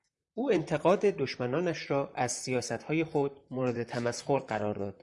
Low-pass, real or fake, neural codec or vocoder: 10.8 kHz; fake; vocoder, 44.1 kHz, 128 mel bands, Pupu-Vocoder